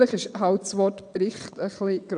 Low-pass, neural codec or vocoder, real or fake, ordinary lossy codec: 9.9 kHz; vocoder, 22.05 kHz, 80 mel bands, WaveNeXt; fake; none